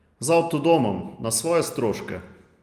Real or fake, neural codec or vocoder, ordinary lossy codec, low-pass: real; none; Opus, 32 kbps; 14.4 kHz